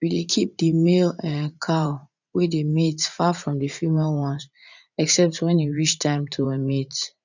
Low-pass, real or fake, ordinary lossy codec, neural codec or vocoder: 7.2 kHz; fake; none; vocoder, 44.1 kHz, 80 mel bands, Vocos